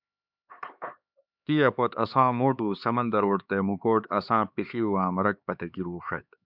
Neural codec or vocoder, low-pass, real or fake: codec, 16 kHz, 4 kbps, X-Codec, HuBERT features, trained on LibriSpeech; 5.4 kHz; fake